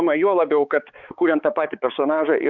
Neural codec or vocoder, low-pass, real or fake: codec, 16 kHz, 4 kbps, X-Codec, HuBERT features, trained on balanced general audio; 7.2 kHz; fake